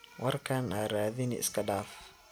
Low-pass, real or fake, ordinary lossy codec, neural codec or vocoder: none; real; none; none